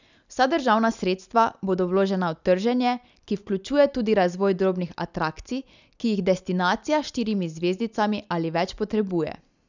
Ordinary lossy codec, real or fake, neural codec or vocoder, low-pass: none; real; none; 7.2 kHz